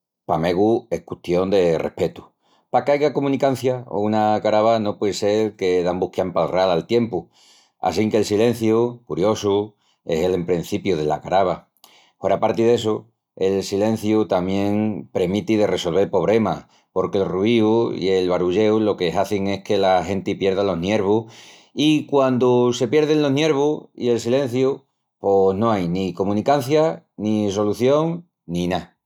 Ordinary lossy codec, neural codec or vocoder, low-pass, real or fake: none; none; 19.8 kHz; real